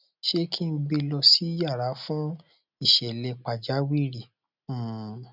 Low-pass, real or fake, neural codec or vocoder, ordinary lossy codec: 5.4 kHz; real; none; none